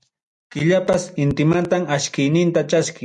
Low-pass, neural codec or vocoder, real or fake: 10.8 kHz; none; real